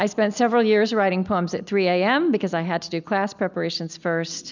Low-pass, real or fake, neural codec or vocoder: 7.2 kHz; real; none